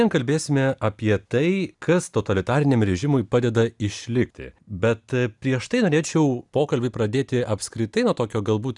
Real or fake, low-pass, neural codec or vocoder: real; 10.8 kHz; none